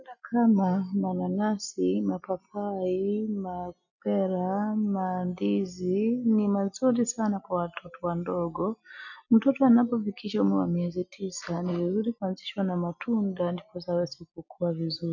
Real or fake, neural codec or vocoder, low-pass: real; none; 7.2 kHz